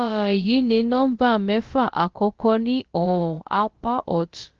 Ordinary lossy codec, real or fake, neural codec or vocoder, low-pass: Opus, 16 kbps; fake; codec, 16 kHz, about 1 kbps, DyCAST, with the encoder's durations; 7.2 kHz